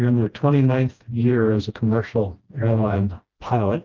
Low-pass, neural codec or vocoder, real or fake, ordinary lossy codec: 7.2 kHz; codec, 16 kHz, 1 kbps, FreqCodec, smaller model; fake; Opus, 24 kbps